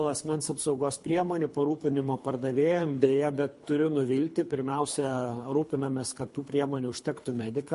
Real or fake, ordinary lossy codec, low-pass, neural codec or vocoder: fake; MP3, 48 kbps; 10.8 kHz; codec, 24 kHz, 3 kbps, HILCodec